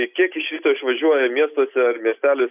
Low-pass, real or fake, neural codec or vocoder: 3.6 kHz; real; none